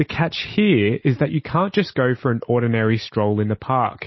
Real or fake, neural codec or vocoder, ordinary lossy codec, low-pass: real; none; MP3, 24 kbps; 7.2 kHz